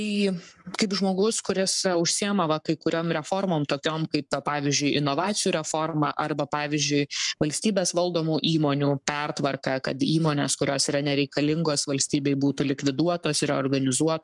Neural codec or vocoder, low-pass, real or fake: codec, 44.1 kHz, 7.8 kbps, Pupu-Codec; 10.8 kHz; fake